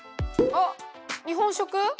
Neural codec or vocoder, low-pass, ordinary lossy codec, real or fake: none; none; none; real